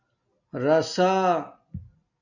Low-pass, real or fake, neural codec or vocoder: 7.2 kHz; real; none